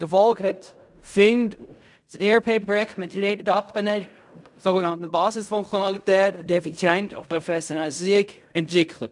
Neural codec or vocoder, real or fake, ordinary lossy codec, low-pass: codec, 16 kHz in and 24 kHz out, 0.4 kbps, LongCat-Audio-Codec, fine tuned four codebook decoder; fake; none; 10.8 kHz